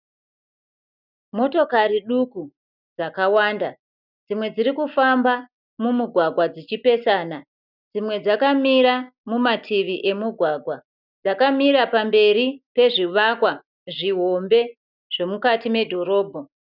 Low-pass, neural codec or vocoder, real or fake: 5.4 kHz; none; real